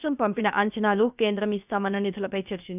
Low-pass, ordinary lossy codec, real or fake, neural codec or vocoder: 3.6 kHz; none; fake; codec, 16 kHz, about 1 kbps, DyCAST, with the encoder's durations